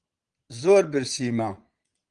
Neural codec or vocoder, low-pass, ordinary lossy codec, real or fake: vocoder, 22.05 kHz, 80 mel bands, Vocos; 9.9 kHz; Opus, 24 kbps; fake